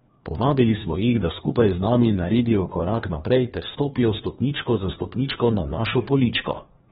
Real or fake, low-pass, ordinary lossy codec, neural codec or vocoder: fake; 7.2 kHz; AAC, 16 kbps; codec, 16 kHz, 2 kbps, FreqCodec, larger model